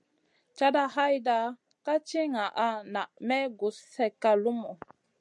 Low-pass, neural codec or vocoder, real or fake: 10.8 kHz; none; real